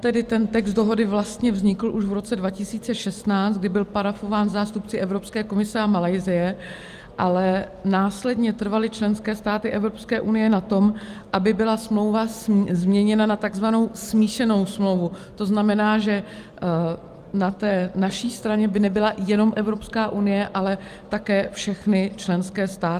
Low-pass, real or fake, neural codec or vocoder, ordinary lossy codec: 14.4 kHz; real; none; Opus, 32 kbps